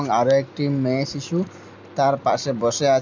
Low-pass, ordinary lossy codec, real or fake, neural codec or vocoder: 7.2 kHz; MP3, 64 kbps; real; none